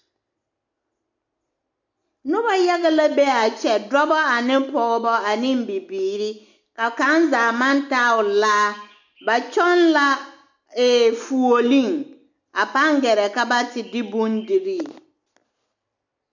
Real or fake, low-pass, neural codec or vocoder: real; 7.2 kHz; none